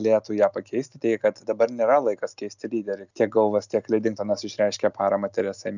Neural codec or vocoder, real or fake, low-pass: none; real; 7.2 kHz